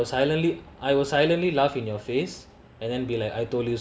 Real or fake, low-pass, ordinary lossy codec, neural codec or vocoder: real; none; none; none